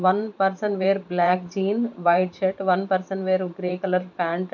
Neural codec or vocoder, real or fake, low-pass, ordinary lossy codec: vocoder, 44.1 kHz, 128 mel bands every 512 samples, BigVGAN v2; fake; 7.2 kHz; none